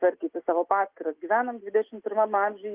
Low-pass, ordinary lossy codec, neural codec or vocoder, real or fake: 3.6 kHz; Opus, 32 kbps; none; real